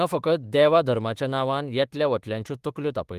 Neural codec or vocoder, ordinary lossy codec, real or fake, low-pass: autoencoder, 48 kHz, 32 numbers a frame, DAC-VAE, trained on Japanese speech; Opus, 24 kbps; fake; 14.4 kHz